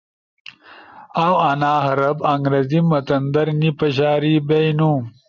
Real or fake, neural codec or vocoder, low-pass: real; none; 7.2 kHz